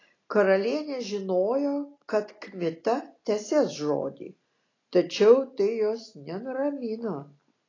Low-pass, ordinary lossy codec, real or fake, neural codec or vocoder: 7.2 kHz; AAC, 32 kbps; real; none